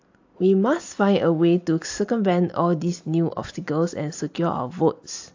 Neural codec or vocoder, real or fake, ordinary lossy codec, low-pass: none; real; AAC, 48 kbps; 7.2 kHz